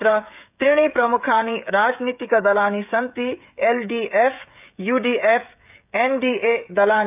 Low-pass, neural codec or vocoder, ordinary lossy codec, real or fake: 3.6 kHz; codec, 16 kHz, 16 kbps, FreqCodec, smaller model; none; fake